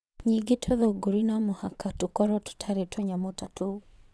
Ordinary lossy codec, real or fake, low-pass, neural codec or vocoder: none; fake; none; vocoder, 22.05 kHz, 80 mel bands, WaveNeXt